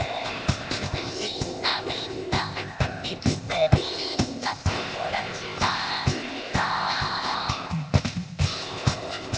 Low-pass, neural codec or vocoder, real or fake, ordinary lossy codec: none; codec, 16 kHz, 0.8 kbps, ZipCodec; fake; none